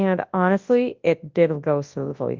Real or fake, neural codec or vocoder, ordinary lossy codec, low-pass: fake; codec, 24 kHz, 0.9 kbps, WavTokenizer, large speech release; Opus, 32 kbps; 7.2 kHz